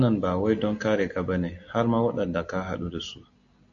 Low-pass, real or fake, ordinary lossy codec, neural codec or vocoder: 7.2 kHz; real; AAC, 64 kbps; none